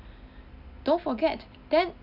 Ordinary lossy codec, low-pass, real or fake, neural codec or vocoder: none; 5.4 kHz; real; none